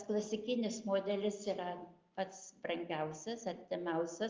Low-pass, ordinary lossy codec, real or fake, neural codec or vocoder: 7.2 kHz; Opus, 32 kbps; real; none